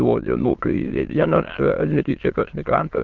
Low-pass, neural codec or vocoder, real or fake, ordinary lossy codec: 7.2 kHz; autoencoder, 22.05 kHz, a latent of 192 numbers a frame, VITS, trained on many speakers; fake; Opus, 16 kbps